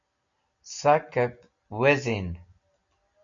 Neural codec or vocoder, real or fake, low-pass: none; real; 7.2 kHz